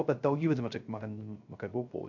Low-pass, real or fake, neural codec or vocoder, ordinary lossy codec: 7.2 kHz; fake; codec, 16 kHz, 0.3 kbps, FocalCodec; none